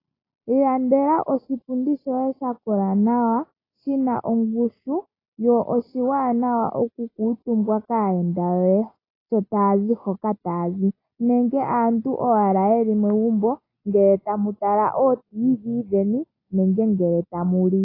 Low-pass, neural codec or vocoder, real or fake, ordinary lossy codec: 5.4 kHz; none; real; AAC, 24 kbps